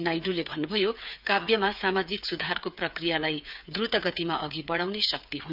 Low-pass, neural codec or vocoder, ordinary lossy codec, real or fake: 5.4 kHz; codec, 16 kHz, 8 kbps, FreqCodec, smaller model; none; fake